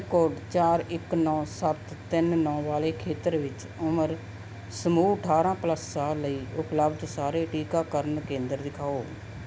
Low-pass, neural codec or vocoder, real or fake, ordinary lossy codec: none; none; real; none